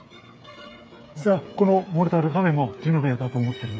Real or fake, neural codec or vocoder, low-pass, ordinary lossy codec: fake; codec, 16 kHz, 8 kbps, FreqCodec, smaller model; none; none